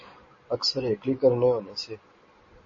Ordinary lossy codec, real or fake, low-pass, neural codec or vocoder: MP3, 32 kbps; real; 7.2 kHz; none